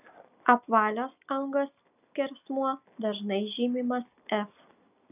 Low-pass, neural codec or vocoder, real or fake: 3.6 kHz; none; real